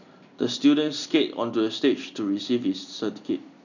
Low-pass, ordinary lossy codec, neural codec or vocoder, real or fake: 7.2 kHz; none; none; real